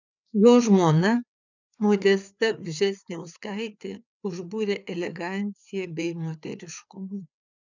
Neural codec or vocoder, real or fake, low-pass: codec, 16 kHz, 4 kbps, FreqCodec, larger model; fake; 7.2 kHz